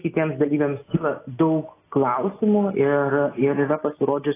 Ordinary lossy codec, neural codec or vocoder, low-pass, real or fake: AAC, 16 kbps; none; 3.6 kHz; real